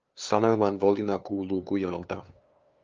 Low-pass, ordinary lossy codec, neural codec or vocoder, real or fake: 7.2 kHz; Opus, 24 kbps; codec, 16 kHz, 2 kbps, FunCodec, trained on LibriTTS, 25 frames a second; fake